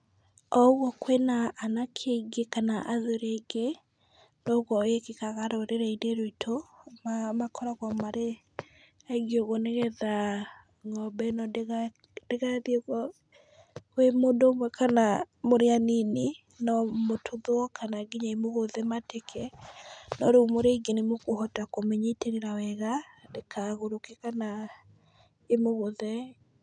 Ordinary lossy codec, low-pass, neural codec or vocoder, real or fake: none; 9.9 kHz; none; real